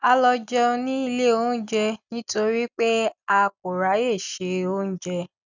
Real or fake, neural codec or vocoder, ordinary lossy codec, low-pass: real; none; none; 7.2 kHz